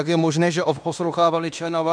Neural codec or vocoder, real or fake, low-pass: codec, 16 kHz in and 24 kHz out, 0.9 kbps, LongCat-Audio-Codec, fine tuned four codebook decoder; fake; 9.9 kHz